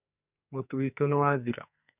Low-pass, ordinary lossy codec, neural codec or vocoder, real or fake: 3.6 kHz; none; codec, 44.1 kHz, 2.6 kbps, SNAC; fake